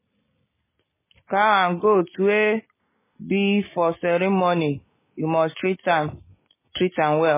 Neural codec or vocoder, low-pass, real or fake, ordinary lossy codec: none; 3.6 kHz; real; MP3, 16 kbps